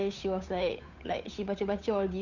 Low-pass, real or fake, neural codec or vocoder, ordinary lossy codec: 7.2 kHz; fake; codec, 16 kHz, 8 kbps, FunCodec, trained on Chinese and English, 25 frames a second; none